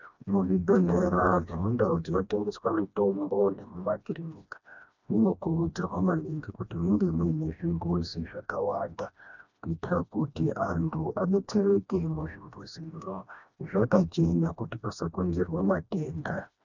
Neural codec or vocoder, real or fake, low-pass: codec, 16 kHz, 1 kbps, FreqCodec, smaller model; fake; 7.2 kHz